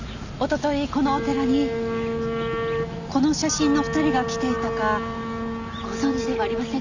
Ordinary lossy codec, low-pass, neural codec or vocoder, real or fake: Opus, 64 kbps; 7.2 kHz; none; real